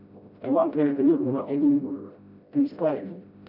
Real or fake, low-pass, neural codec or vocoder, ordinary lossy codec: fake; 5.4 kHz; codec, 16 kHz, 0.5 kbps, FreqCodec, smaller model; none